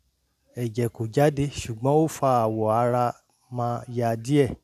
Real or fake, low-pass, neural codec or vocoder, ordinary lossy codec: real; 14.4 kHz; none; none